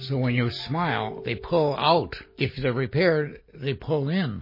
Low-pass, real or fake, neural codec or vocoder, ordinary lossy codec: 5.4 kHz; real; none; MP3, 24 kbps